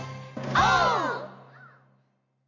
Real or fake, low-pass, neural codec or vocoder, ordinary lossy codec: real; 7.2 kHz; none; AAC, 48 kbps